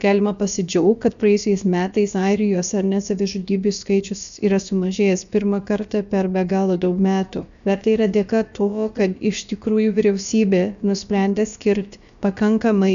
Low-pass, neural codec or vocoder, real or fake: 7.2 kHz; codec, 16 kHz, about 1 kbps, DyCAST, with the encoder's durations; fake